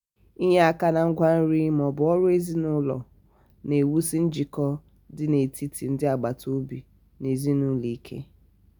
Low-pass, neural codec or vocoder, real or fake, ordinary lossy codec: 19.8 kHz; none; real; none